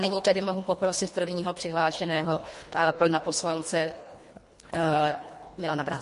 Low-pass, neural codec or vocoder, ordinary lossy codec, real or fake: 10.8 kHz; codec, 24 kHz, 1.5 kbps, HILCodec; MP3, 48 kbps; fake